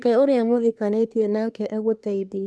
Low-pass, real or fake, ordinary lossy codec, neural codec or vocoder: none; fake; none; codec, 24 kHz, 1 kbps, SNAC